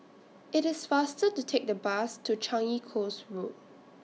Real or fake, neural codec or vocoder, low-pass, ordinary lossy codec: real; none; none; none